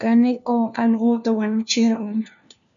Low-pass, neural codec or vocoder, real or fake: 7.2 kHz; codec, 16 kHz, 1 kbps, FunCodec, trained on LibriTTS, 50 frames a second; fake